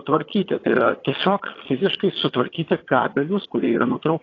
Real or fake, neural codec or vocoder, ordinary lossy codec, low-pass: fake; vocoder, 22.05 kHz, 80 mel bands, HiFi-GAN; AAC, 32 kbps; 7.2 kHz